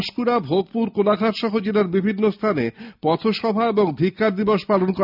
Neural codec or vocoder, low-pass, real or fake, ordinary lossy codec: none; 5.4 kHz; real; none